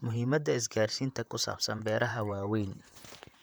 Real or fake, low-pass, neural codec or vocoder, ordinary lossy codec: fake; none; vocoder, 44.1 kHz, 128 mel bands, Pupu-Vocoder; none